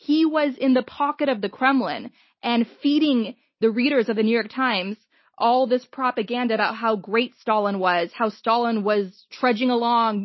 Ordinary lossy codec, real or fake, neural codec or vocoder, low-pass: MP3, 24 kbps; real; none; 7.2 kHz